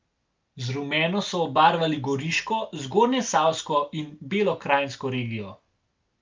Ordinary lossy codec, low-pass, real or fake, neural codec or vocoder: Opus, 24 kbps; 7.2 kHz; real; none